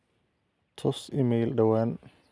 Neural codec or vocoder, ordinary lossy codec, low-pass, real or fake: none; none; none; real